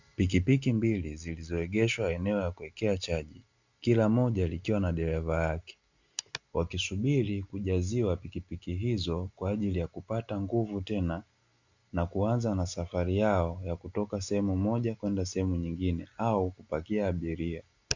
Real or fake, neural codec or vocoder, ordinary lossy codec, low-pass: real; none; Opus, 64 kbps; 7.2 kHz